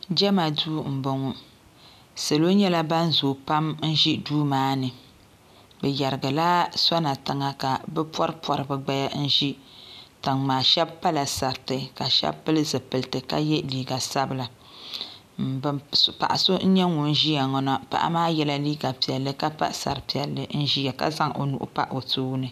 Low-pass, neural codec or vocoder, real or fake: 14.4 kHz; none; real